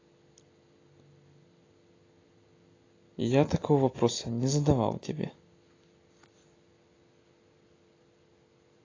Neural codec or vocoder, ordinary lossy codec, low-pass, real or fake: none; AAC, 32 kbps; 7.2 kHz; real